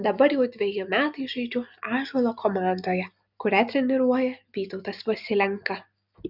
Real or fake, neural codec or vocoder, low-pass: real; none; 5.4 kHz